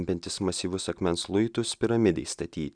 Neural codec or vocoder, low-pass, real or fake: none; 9.9 kHz; real